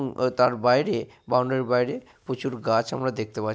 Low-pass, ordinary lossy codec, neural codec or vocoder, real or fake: none; none; none; real